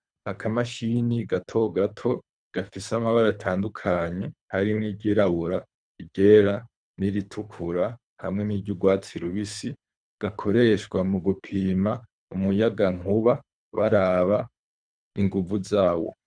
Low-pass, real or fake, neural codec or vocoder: 9.9 kHz; fake; codec, 24 kHz, 3 kbps, HILCodec